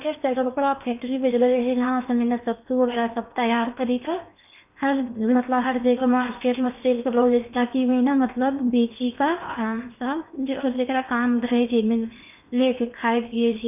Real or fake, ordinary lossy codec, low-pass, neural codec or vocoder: fake; none; 3.6 kHz; codec, 16 kHz in and 24 kHz out, 0.8 kbps, FocalCodec, streaming, 65536 codes